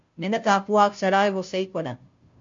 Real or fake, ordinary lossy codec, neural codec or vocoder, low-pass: fake; MP3, 48 kbps; codec, 16 kHz, 0.5 kbps, FunCodec, trained on Chinese and English, 25 frames a second; 7.2 kHz